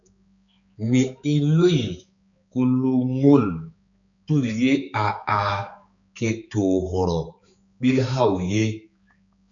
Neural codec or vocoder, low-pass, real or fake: codec, 16 kHz, 4 kbps, X-Codec, HuBERT features, trained on balanced general audio; 7.2 kHz; fake